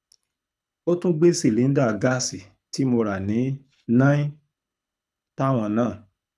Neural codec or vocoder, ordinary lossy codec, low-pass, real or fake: codec, 24 kHz, 6 kbps, HILCodec; none; none; fake